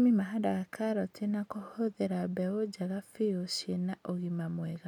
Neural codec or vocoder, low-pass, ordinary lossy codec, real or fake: none; 19.8 kHz; none; real